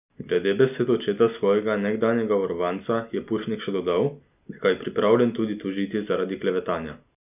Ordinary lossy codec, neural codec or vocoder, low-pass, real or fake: none; none; 3.6 kHz; real